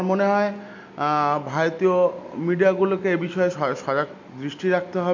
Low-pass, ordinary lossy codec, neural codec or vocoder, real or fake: 7.2 kHz; MP3, 48 kbps; none; real